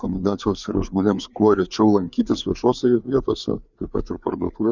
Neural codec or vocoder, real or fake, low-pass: codec, 16 kHz, 4 kbps, FreqCodec, larger model; fake; 7.2 kHz